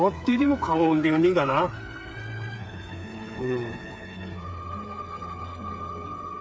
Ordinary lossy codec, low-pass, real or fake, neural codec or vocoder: none; none; fake; codec, 16 kHz, 8 kbps, FreqCodec, smaller model